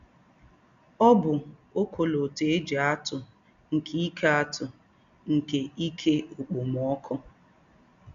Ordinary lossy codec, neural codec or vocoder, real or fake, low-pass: none; none; real; 7.2 kHz